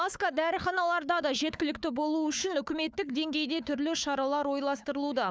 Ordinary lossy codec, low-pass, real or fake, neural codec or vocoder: none; none; fake; codec, 16 kHz, 4 kbps, FunCodec, trained on Chinese and English, 50 frames a second